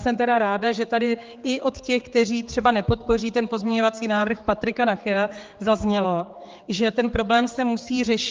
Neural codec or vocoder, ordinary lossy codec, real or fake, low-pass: codec, 16 kHz, 4 kbps, X-Codec, HuBERT features, trained on general audio; Opus, 32 kbps; fake; 7.2 kHz